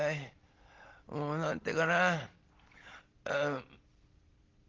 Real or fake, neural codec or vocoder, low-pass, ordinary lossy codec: real; none; 7.2 kHz; Opus, 16 kbps